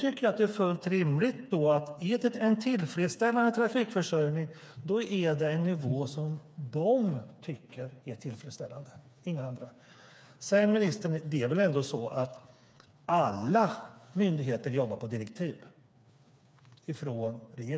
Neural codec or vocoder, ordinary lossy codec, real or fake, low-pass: codec, 16 kHz, 4 kbps, FreqCodec, smaller model; none; fake; none